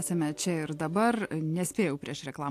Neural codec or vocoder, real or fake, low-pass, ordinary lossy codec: none; real; 14.4 kHz; AAC, 64 kbps